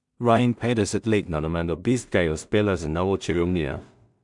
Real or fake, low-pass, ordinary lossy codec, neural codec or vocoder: fake; 10.8 kHz; none; codec, 16 kHz in and 24 kHz out, 0.4 kbps, LongCat-Audio-Codec, two codebook decoder